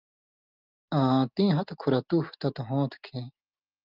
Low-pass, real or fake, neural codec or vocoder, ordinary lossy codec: 5.4 kHz; real; none; Opus, 24 kbps